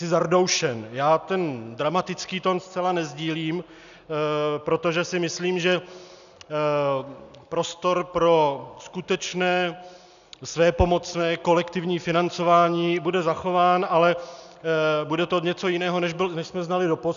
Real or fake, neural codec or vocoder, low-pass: real; none; 7.2 kHz